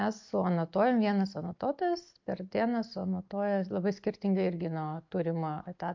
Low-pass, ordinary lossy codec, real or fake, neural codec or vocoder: 7.2 kHz; MP3, 48 kbps; real; none